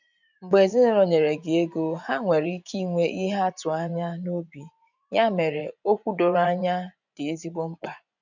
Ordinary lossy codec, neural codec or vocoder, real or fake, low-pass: none; vocoder, 24 kHz, 100 mel bands, Vocos; fake; 7.2 kHz